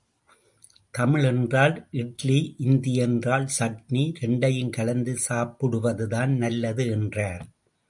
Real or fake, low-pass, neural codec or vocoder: real; 10.8 kHz; none